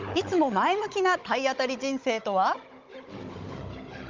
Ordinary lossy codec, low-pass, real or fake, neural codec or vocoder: Opus, 24 kbps; 7.2 kHz; fake; codec, 16 kHz, 16 kbps, FunCodec, trained on Chinese and English, 50 frames a second